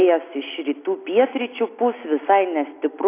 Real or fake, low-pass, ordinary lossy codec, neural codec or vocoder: real; 3.6 kHz; AAC, 24 kbps; none